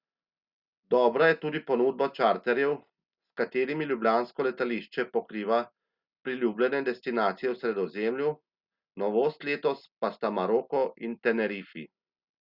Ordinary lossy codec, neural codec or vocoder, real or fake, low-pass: Opus, 64 kbps; none; real; 5.4 kHz